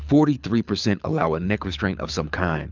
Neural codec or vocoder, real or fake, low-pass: codec, 24 kHz, 6 kbps, HILCodec; fake; 7.2 kHz